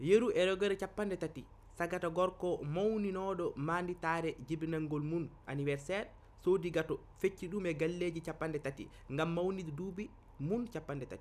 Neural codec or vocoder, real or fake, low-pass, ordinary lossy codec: none; real; 14.4 kHz; none